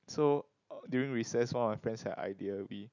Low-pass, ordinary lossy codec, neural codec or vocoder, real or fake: 7.2 kHz; none; none; real